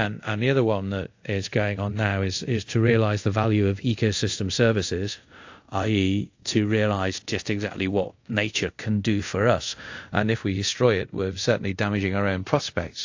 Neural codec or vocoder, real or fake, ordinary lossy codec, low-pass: codec, 24 kHz, 0.5 kbps, DualCodec; fake; AAC, 48 kbps; 7.2 kHz